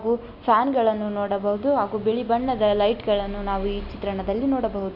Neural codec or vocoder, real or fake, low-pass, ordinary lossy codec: none; real; 5.4 kHz; none